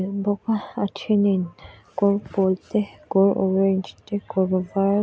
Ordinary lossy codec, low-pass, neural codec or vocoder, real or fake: none; none; none; real